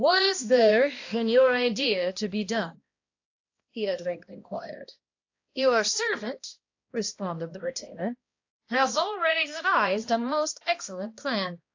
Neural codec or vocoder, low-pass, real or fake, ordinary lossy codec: codec, 16 kHz, 1 kbps, X-Codec, HuBERT features, trained on balanced general audio; 7.2 kHz; fake; AAC, 48 kbps